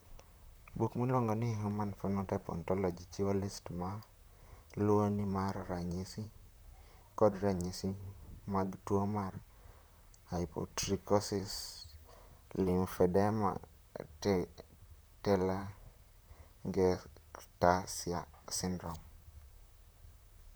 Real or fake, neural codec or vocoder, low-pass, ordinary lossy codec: fake; vocoder, 44.1 kHz, 128 mel bands, Pupu-Vocoder; none; none